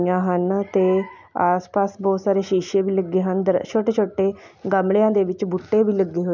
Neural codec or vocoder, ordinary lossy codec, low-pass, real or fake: none; none; 7.2 kHz; real